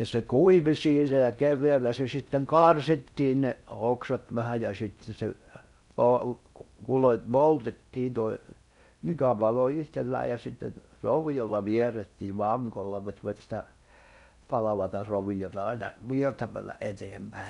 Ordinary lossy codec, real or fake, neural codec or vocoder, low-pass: none; fake; codec, 16 kHz in and 24 kHz out, 0.6 kbps, FocalCodec, streaming, 4096 codes; 10.8 kHz